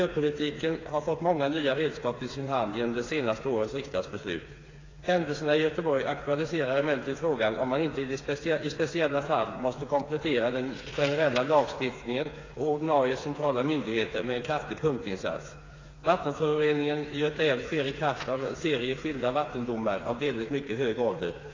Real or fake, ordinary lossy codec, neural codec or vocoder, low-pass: fake; AAC, 32 kbps; codec, 16 kHz, 4 kbps, FreqCodec, smaller model; 7.2 kHz